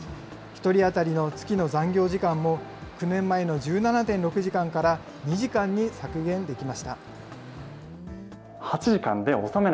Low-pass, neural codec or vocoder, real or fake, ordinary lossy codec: none; none; real; none